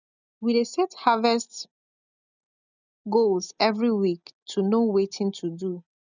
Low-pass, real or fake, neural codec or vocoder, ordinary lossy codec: 7.2 kHz; real; none; none